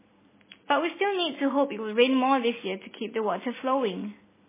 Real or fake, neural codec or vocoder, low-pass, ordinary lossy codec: real; none; 3.6 kHz; MP3, 16 kbps